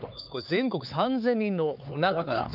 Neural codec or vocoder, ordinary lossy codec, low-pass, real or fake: codec, 16 kHz, 4 kbps, X-Codec, HuBERT features, trained on LibriSpeech; none; 5.4 kHz; fake